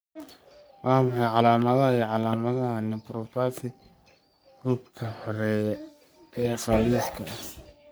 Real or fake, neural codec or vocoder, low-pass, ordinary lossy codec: fake; codec, 44.1 kHz, 3.4 kbps, Pupu-Codec; none; none